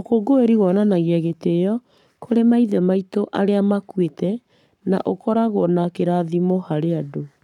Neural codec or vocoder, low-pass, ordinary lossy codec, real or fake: codec, 44.1 kHz, 7.8 kbps, Pupu-Codec; 19.8 kHz; none; fake